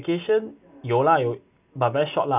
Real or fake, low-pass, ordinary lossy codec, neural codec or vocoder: real; 3.6 kHz; none; none